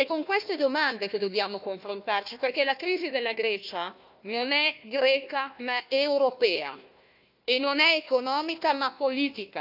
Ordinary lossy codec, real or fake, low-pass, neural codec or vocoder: none; fake; 5.4 kHz; codec, 16 kHz, 1 kbps, FunCodec, trained on Chinese and English, 50 frames a second